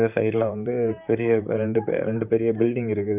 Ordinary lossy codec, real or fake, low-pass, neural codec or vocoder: none; fake; 3.6 kHz; vocoder, 44.1 kHz, 128 mel bands, Pupu-Vocoder